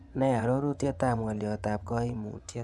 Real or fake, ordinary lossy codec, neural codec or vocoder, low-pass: fake; none; vocoder, 24 kHz, 100 mel bands, Vocos; none